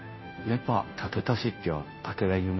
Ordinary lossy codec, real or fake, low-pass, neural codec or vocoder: MP3, 24 kbps; fake; 7.2 kHz; codec, 16 kHz, 0.5 kbps, FunCodec, trained on Chinese and English, 25 frames a second